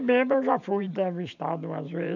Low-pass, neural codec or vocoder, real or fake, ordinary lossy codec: 7.2 kHz; vocoder, 44.1 kHz, 128 mel bands every 512 samples, BigVGAN v2; fake; none